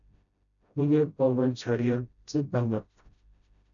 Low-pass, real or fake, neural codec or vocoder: 7.2 kHz; fake; codec, 16 kHz, 0.5 kbps, FreqCodec, smaller model